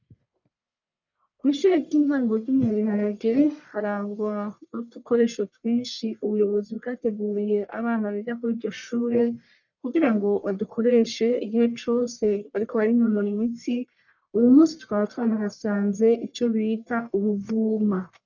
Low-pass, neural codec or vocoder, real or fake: 7.2 kHz; codec, 44.1 kHz, 1.7 kbps, Pupu-Codec; fake